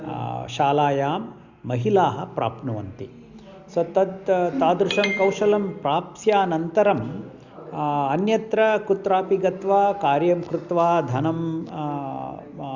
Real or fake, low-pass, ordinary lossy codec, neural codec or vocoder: real; 7.2 kHz; Opus, 64 kbps; none